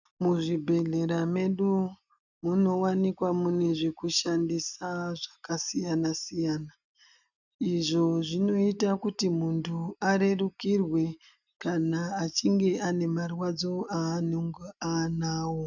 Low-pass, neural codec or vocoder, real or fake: 7.2 kHz; none; real